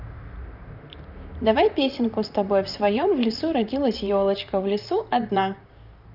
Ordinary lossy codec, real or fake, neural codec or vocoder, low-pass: none; fake; vocoder, 44.1 kHz, 128 mel bands, Pupu-Vocoder; 5.4 kHz